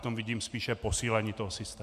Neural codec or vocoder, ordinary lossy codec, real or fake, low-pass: none; MP3, 96 kbps; real; 14.4 kHz